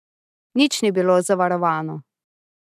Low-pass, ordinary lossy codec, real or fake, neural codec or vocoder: 14.4 kHz; none; real; none